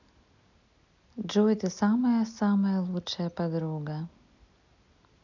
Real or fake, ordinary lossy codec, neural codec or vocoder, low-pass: real; none; none; 7.2 kHz